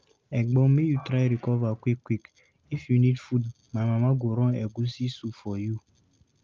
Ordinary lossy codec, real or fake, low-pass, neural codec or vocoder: Opus, 32 kbps; real; 7.2 kHz; none